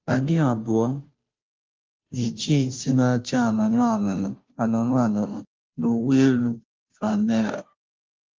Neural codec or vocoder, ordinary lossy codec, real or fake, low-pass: codec, 16 kHz, 0.5 kbps, FunCodec, trained on Chinese and English, 25 frames a second; Opus, 32 kbps; fake; 7.2 kHz